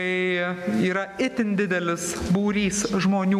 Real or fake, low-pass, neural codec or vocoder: real; 14.4 kHz; none